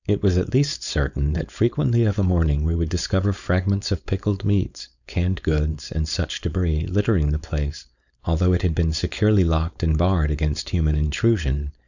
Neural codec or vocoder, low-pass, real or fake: codec, 16 kHz, 4.8 kbps, FACodec; 7.2 kHz; fake